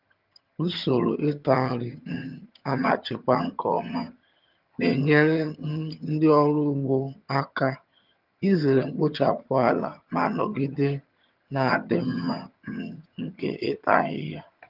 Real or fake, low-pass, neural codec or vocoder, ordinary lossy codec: fake; 5.4 kHz; vocoder, 22.05 kHz, 80 mel bands, HiFi-GAN; Opus, 24 kbps